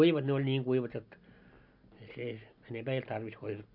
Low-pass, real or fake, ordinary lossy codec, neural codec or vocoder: 5.4 kHz; real; none; none